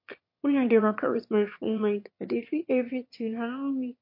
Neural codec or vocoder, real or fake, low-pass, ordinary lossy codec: autoencoder, 22.05 kHz, a latent of 192 numbers a frame, VITS, trained on one speaker; fake; 5.4 kHz; MP3, 32 kbps